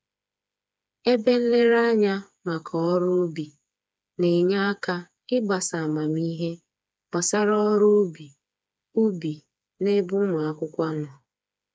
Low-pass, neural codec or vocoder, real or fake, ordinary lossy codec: none; codec, 16 kHz, 4 kbps, FreqCodec, smaller model; fake; none